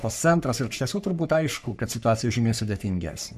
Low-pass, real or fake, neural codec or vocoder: 14.4 kHz; fake; codec, 44.1 kHz, 3.4 kbps, Pupu-Codec